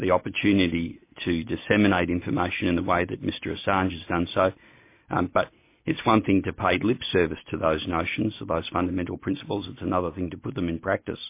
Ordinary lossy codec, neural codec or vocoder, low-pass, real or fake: MP3, 24 kbps; none; 3.6 kHz; real